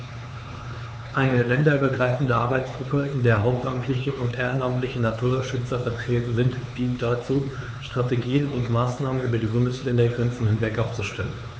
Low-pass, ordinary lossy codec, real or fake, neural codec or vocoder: none; none; fake; codec, 16 kHz, 4 kbps, X-Codec, HuBERT features, trained on LibriSpeech